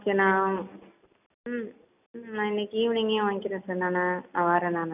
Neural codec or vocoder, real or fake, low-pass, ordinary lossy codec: none; real; 3.6 kHz; none